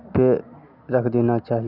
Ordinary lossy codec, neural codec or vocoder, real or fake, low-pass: none; none; real; 5.4 kHz